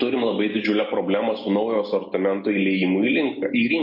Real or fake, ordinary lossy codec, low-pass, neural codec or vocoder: real; MP3, 32 kbps; 5.4 kHz; none